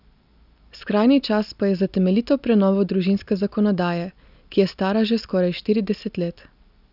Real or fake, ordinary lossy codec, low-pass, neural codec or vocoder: real; none; 5.4 kHz; none